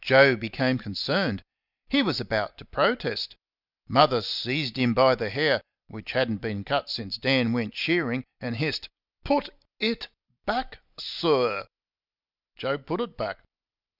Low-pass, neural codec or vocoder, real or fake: 5.4 kHz; none; real